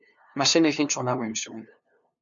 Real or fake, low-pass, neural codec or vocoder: fake; 7.2 kHz; codec, 16 kHz, 2 kbps, FunCodec, trained on LibriTTS, 25 frames a second